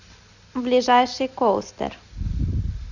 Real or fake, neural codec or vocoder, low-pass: real; none; 7.2 kHz